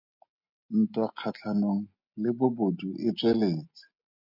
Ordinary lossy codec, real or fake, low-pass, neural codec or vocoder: MP3, 48 kbps; real; 5.4 kHz; none